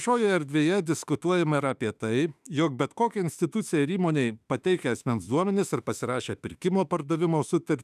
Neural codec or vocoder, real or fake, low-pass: autoencoder, 48 kHz, 32 numbers a frame, DAC-VAE, trained on Japanese speech; fake; 14.4 kHz